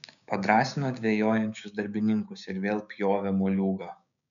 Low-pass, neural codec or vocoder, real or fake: 7.2 kHz; codec, 16 kHz, 6 kbps, DAC; fake